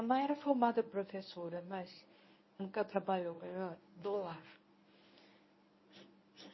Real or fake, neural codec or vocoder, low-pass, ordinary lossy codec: fake; codec, 24 kHz, 0.9 kbps, WavTokenizer, medium speech release version 1; 7.2 kHz; MP3, 24 kbps